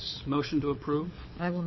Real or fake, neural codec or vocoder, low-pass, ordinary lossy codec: fake; codec, 24 kHz, 6 kbps, HILCodec; 7.2 kHz; MP3, 24 kbps